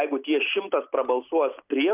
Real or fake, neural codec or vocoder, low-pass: real; none; 3.6 kHz